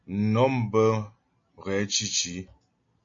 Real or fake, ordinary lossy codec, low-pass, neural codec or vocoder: real; MP3, 48 kbps; 7.2 kHz; none